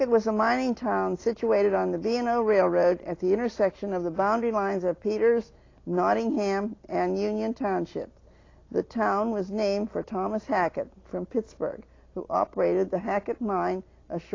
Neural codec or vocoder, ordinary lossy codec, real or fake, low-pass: none; AAC, 32 kbps; real; 7.2 kHz